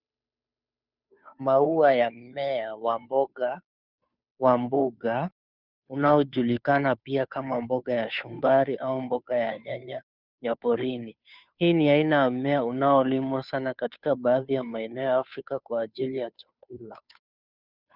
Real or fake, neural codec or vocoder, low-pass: fake; codec, 16 kHz, 2 kbps, FunCodec, trained on Chinese and English, 25 frames a second; 5.4 kHz